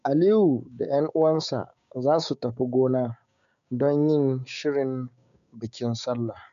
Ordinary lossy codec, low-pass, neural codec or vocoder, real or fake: none; 7.2 kHz; codec, 16 kHz, 6 kbps, DAC; fake